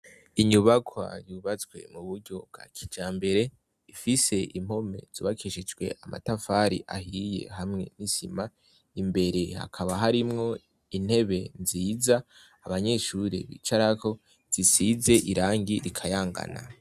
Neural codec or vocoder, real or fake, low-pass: none; real; 14.4 kHz